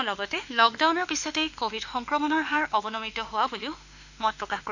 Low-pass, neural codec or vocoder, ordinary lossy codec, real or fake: 7.2 kHz; autoencoder, 48 kHz, 32 numbers a frame, DAC-VAE, trained on Japanese speech; none; fake